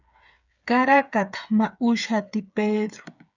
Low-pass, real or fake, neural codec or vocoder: 7.2 kHz; fake; codec, 16 kHz, 8 kbps, FreqCodec, smaller model